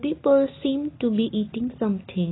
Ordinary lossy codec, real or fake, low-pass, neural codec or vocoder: AAC, 16 kbps; real; 7.2 kHz; none